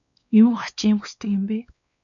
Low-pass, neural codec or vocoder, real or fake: 7.2 kHz; codec, 16 kHz, 2 kbps, X-Codec, WavLM features, trained on Multilingual LibriSpeech; fake